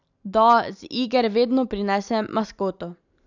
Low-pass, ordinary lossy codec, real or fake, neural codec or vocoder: 7.2 kHz; none; real; none